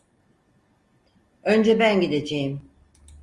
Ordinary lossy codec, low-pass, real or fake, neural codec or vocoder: Opus, 32 kbps; 10.8 kHz; real; none